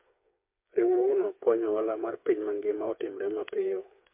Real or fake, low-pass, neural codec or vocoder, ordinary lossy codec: fake; 3.6 kHz; codec, 16 kHz, 4 kbps, FreqCodec, smaller model; MP3, 32 kbps